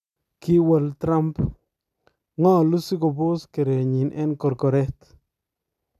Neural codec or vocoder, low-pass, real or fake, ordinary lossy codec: none; 14.4 kHz; real; none